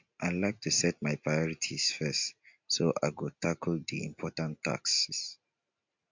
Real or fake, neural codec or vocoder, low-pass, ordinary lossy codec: real; none; 7.2 kHz; MP3, 64 kbps